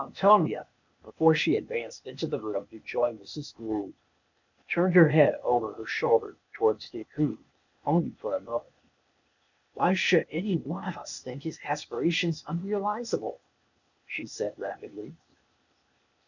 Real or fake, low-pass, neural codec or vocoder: fake; 7.2 kHz; codec, 16 kHz, 0.8 kbps, ZipCodec